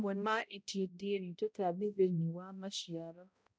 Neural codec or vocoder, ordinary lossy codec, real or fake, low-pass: codec, 16 kHz, 0.5 kbps, X-Codec, HuBERT features, trained on balanced general audio; none; fake; none